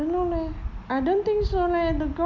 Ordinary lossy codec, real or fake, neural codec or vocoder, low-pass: none; real; none; 7.2 kHz